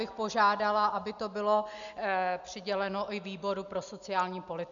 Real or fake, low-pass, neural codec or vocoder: real; 7.2 kHz; none